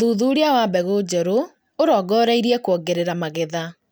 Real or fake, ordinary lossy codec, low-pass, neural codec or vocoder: real; none; none; none